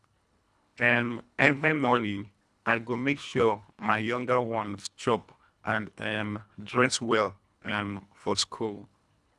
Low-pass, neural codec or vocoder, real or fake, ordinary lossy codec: none; codec, 24 kHz, 1.5 kbps, HILCodec; fake; none